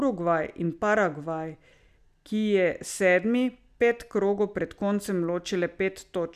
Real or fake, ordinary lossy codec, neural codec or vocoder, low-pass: real; none; none; 14.4 kHz